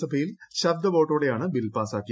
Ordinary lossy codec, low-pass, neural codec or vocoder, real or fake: none; none; none; real